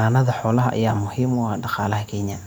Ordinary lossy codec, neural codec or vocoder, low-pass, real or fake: none; none; none; real